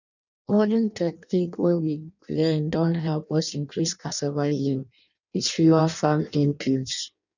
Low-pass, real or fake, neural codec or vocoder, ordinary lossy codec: 7.2 kHz; fake; codec, 16 kHz in and 24 kHz out, 0.6 kbps, FireRedTTS-2 codec; none